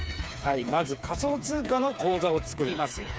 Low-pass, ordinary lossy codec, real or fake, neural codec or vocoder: none; none; fake; codec, 16 kHz, 4 kbps, FreqCodec, smaller model